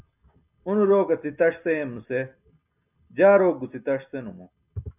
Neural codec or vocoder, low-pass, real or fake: none; 3.6 kHz; real